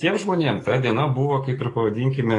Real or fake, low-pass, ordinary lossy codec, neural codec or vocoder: fake; 10.8 kHz; AAC, 32 kbps; codec, 44.1 kHz, 7.8 kbps, DAC